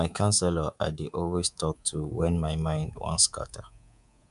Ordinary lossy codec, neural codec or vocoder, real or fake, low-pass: none; codec, 24 kHz, 3.1 kbps, DualCodec; fake; 10.8 kHz